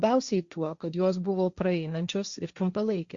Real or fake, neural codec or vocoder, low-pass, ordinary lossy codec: fake; codec, 16 kHz, 1.1 kbps, Voila-Tokenizer; 7.2 kHz; Opus, 64 kbps